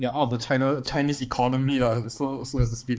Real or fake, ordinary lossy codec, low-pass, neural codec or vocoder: fake; none; none; codec, 16 kHz, 2 kbps, X-Codec, HuBERT features, trained on balanced general audio